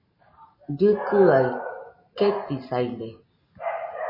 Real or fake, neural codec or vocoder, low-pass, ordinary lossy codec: real; none; 5.4 kHz; MP3, 24 kbps